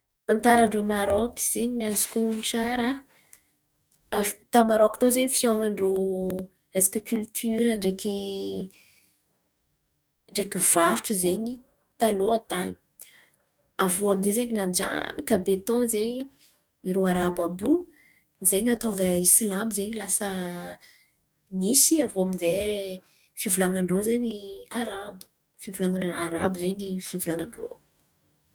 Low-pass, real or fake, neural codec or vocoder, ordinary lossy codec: none; fake; codec, 44.1 kHz, 2.6 kbps, DAC; none